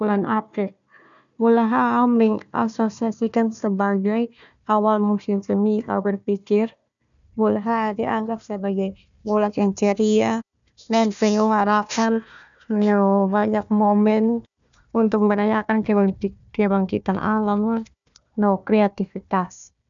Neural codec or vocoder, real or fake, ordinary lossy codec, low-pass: codec, 16 kHz, 1 kbps, FunCodec, trained on Chinese and English, 50 frames a second; fake; none; 7.2 kHz